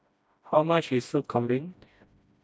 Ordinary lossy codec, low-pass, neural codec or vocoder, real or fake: none; none; codec, 16 kHz, 1 kbps, FreqCodec, smaller model; fake